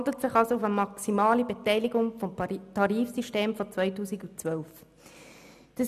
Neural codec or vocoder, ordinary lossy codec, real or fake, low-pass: none; none; real; 14.4 kHz